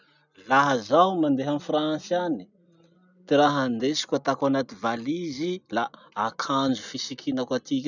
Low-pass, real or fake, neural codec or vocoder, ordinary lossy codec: 7.2 kHz; real; none; none